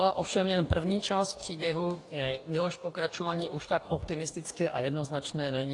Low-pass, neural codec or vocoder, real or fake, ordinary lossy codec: 10.8 kHz; codec, 44.1 kHz, 2.6 kbps, DAC; fake; AAC, 48 kbps